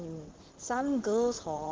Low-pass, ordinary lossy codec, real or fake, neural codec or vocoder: 7.2 kHz; Opus, 16 kbps; fake; vocoder, 44.1 kHz, 128 mel bands, Pupu-Vocoder